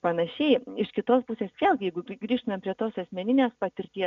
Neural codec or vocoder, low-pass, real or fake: none; 7.2 kHz; real